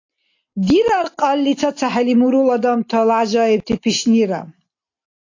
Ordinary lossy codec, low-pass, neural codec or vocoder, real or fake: AAC, 48 kbps; 7.2 kHz; none; real